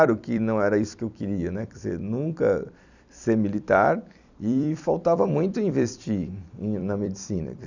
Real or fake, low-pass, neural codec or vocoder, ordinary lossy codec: fake; 7.2 kHz; vocoder, 44.1 kHz, 128 mel bands every 256 samples, BigVGAN v2; none